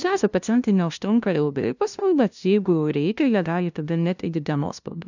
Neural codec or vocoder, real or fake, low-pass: codec, 16 kHz, 0.5 kbps, FunCodec, trained on LibriTTS, 25 frames a second; fake; 7.2 kHz